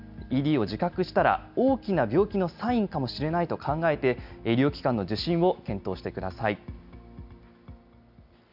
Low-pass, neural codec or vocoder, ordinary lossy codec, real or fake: 5.4 kHz; none; none; real